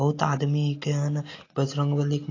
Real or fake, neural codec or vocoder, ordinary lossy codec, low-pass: real; none; AAC, 32 kbps; 7.2 kHz